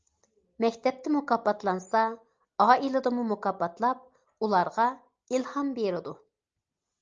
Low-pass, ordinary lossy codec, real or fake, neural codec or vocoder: 7.2 kHz; Opus, 24 kbps; real; none